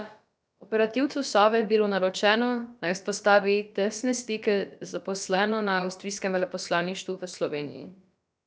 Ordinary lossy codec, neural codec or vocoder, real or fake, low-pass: none; codec, 16 kHz, about 1 kbps, DyCAST, with the encoder's durations; fake; none